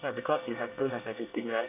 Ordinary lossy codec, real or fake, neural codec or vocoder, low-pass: none; fake; codec, 24 kHz, 1 kbps, SNAC; 3.6 kHz